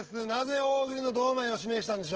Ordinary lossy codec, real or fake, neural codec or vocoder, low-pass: Opus, 16 kbps; fake; codec, 16 kHz, 16 kbps, FreqCodec, smaller model; 7.2 kHz